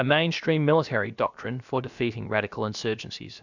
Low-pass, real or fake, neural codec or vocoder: 7.2 kHz; fake; codec, 16 kHz, about 1 kbps, DyCAST, with the encoder's durations